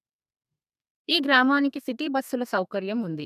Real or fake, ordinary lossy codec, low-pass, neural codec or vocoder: fake; none; 14.4 kHz; codec, 32 kHz, 1.9 kbps, SNAC